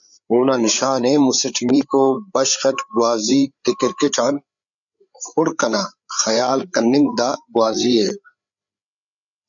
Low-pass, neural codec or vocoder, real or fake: 7.2 kHz; codec, 16 kHz, 8 kbps, FreqCodec, larger model; fake